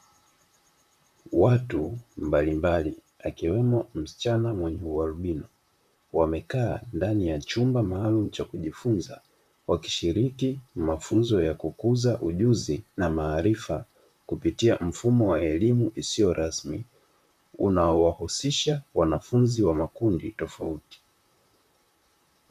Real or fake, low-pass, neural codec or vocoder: fake; 14.4 kHz; vocoder, 44.1 kHz, 128 mel bands, Pupu-Vocoder